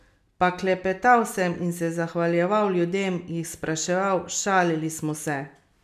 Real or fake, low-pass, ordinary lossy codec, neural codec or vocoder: real; 14.4 kHz; none; none